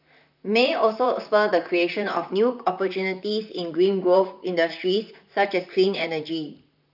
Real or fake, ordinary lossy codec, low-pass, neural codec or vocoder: fake; none; 5.4 kHz; vocoder, 44.1 kHz, 128 mel bands, Pupu-Vocoder